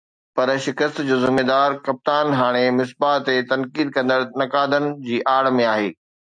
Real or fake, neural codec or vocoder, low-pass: real; none; 9.9 kHz